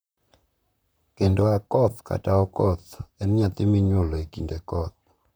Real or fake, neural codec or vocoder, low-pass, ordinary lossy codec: fake; vocoder, 44.1 kHz, 128 mel bands, Pupu-Vocoder; none; none